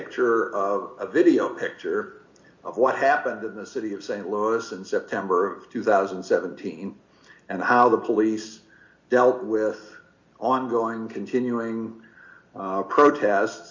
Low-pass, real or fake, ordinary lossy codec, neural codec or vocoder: 7.2 kHz; real; MP3, 48 kbps; none